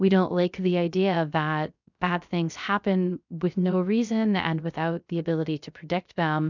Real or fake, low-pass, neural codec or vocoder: fake; 7.2 kHz; codec, 16 kHz, 0.3 kbps, FocalCodec